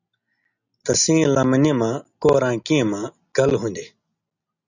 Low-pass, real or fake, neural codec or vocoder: 7.2 kHz; real; none